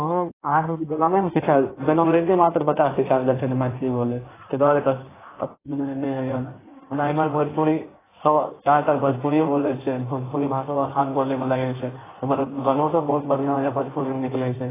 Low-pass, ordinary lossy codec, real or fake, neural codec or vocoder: 3.6 kHz; AAC, 16 kbps; fake; codec, 16 kHz in and 24 kHz out, 1.1 kbps, FireRedTTS-2 codec